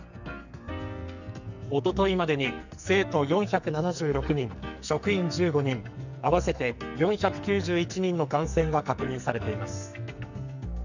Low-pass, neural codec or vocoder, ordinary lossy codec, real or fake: 7.2 kHz; codec, 44.1 kHz, 2.6 kbps, SNAC; none; fake